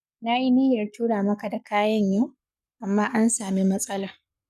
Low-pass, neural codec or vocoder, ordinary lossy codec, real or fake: 14.4 kHz; codec, 44.1 kHz, 7.8 kbps, Pupu-Codec; none; fake